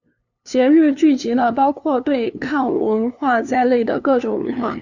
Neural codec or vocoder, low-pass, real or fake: codec, 16 kHz, 2 kbps, FunCodec, trained on LibriTTS, 25 frames a second; 7.2 kHz; fake